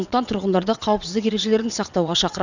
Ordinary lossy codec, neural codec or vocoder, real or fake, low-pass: none; vocoder, 22.05 kHz, 80 mel bands, WaveNeXt; fake; 7.2 kHz